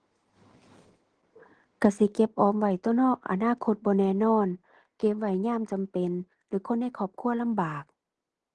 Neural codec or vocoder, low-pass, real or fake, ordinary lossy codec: none; 9.9 kHz; real; Opus, 16 kbps